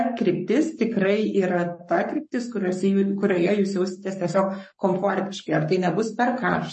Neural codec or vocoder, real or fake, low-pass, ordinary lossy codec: codec, 44.1 kHz, 7.8 kbps, Pupu-Codec; fake; 10.8 kHz; MP3, 32 kbps